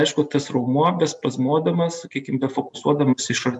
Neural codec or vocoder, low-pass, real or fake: none; 10.8 kHz; real